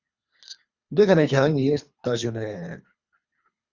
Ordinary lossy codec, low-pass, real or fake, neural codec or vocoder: Opus, 64 kbps; 7.2 kHz; fake; codec, 24 kHz, 3 kbps, HILCodec